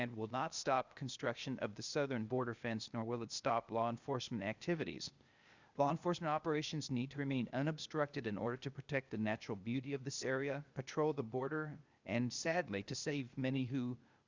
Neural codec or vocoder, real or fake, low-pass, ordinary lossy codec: codec, 16 kHz, 0.8 kbps, ZipCodec; fake; 7.2 kHz; Opus, 64 kbps